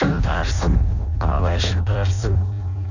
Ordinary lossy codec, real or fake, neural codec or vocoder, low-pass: none; fake; codec, 16 kHz in and 24 kHz out, 0.6 kbps, FireRedTTS-2 codec; 7.2 kHz